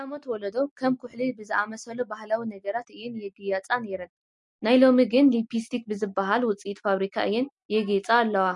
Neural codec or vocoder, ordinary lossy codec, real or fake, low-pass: none; MP3, 48 kbps; real; 10.8 kHz